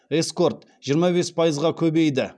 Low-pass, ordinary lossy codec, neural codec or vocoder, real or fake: none; none; none; real